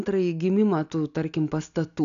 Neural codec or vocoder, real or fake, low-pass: none; real; 7.2 kHz